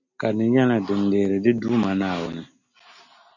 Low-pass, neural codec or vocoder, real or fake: 7.2 kHz; none; real